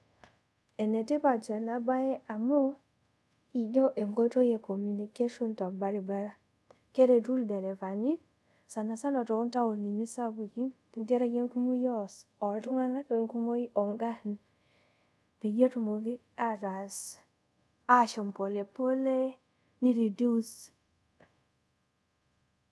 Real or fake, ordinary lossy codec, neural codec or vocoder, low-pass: fake; none; codec, 24 kHz, 0.5 kbps, DualCodec; none